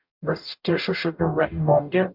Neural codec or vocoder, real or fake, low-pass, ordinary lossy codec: codec, 44.1 kHz, 0.9 kbps, DAC; fake; 5.4 kHz; MP3, 48 kbps